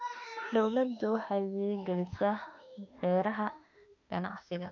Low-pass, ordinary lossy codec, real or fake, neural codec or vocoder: 7.2 kHz; none; fake; autoencoder, 48 kHz, 32 numbers a frame, DAC-VAE, trained on Japanese speech